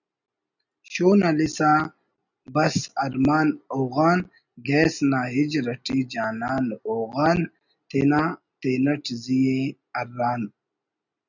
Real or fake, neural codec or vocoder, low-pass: real; none; 7.2 kHz